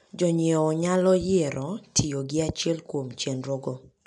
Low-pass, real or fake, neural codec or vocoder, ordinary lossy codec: 10.8 kHz; real; none; none